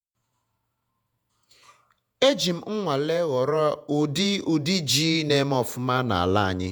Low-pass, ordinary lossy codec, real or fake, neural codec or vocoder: none; none; fake; vocoder, 48 kHz, 128 mel bands, Vocos